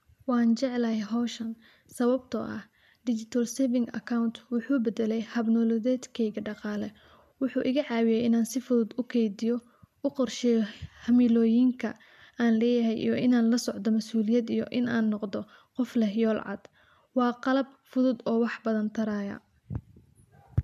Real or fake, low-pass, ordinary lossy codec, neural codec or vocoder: real; 14.4 kHz; MP3, 96 kbps; none